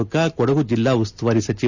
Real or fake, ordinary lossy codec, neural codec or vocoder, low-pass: real; none; none; 7.2 kHz